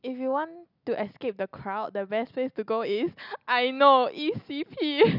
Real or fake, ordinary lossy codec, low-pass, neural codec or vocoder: real; none; 5.4 kHz; none